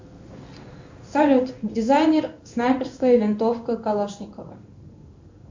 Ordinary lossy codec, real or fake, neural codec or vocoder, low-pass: MP3, 64 kbps; fake; codec, 16 kHz in and 24 kHz out, 1 kbps, XY-Tokenizer; 7.2 kHz